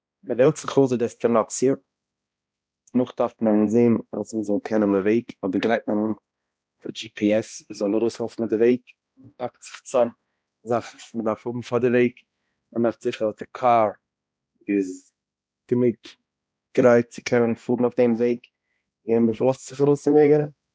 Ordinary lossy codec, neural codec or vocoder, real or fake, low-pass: none; codec, 16 kHz, 1 kbps, X-Codec, HuBERT features, trained on balanced general audio; fake; none